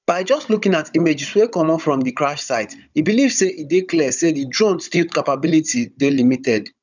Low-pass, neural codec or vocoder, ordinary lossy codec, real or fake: 7.2 kHz; codec, 16 kHz, 16 kbps, FunCodec, trained on Chinese and English, 50 frames a second; none; fake